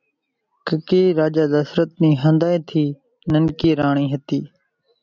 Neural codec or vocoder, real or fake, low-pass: none; real; 7.2 kHz